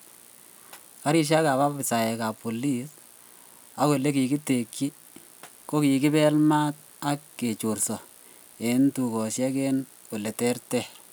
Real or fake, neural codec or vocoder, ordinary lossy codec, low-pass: real; none; none; none